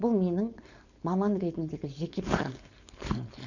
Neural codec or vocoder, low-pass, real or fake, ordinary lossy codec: codec, 16 kHz, 4.8 kbps, FACodec; 7.2 kHz; fake; none